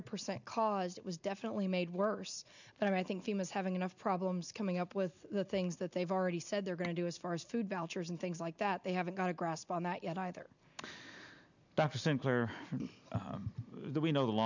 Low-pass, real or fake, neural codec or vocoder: 7.2 kHz; real; none